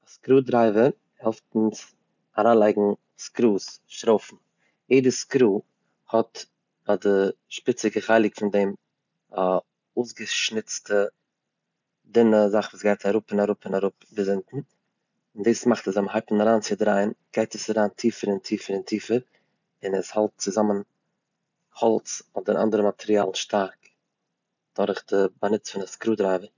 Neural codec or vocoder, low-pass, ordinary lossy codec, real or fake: none; 7.2 kHz; none; real